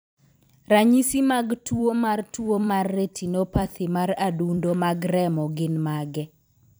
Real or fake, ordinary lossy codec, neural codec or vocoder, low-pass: fake; none; vocoder, 44.1 kHz, 128 mel bands every 256 samples, BigVGAN v2; none